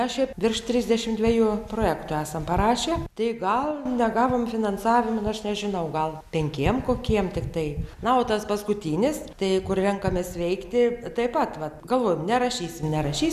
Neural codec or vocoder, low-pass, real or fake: none; 14.4 kHz; real